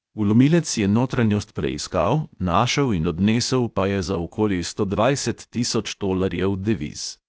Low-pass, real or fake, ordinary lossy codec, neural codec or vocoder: none; fake; none; codec, 16 kHz, 0.8 kbps, ZipCodec